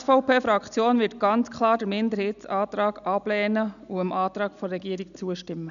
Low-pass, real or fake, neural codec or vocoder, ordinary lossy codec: 7.2 kHz; real; none; none